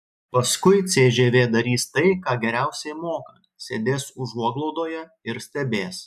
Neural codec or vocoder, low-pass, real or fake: none; 14.4 kHz; real